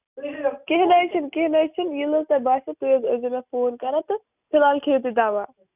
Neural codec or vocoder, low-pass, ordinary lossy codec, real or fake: none; 3.6 kHz; none; real